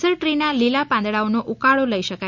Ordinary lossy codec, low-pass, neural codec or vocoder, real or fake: none; 7.2 kHz; none; real